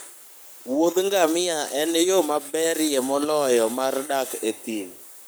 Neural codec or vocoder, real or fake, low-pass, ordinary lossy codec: codec, 44.1 kHz, 7.8 kbps, Pupu-Codec; fake; none; none